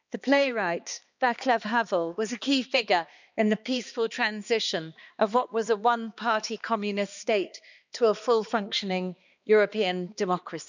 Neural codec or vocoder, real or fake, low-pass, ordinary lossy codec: codec, 16 kHz, 2 kbps, X-Codec, HuBERT features, trained on balanced general audio; fake; 7.2 kHz; none